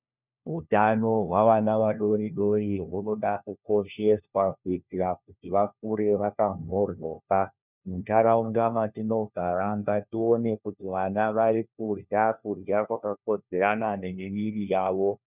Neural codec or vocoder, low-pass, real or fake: codec, 16 kHz, 1 kbps, FunCodec, trained on LibriTTS, 50 frames a second; 3.6 kHz; fake